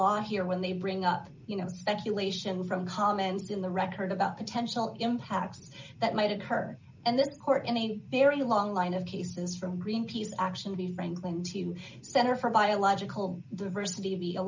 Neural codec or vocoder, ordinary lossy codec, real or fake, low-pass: none; MP3, 64 kbps; real; 7.2 kHz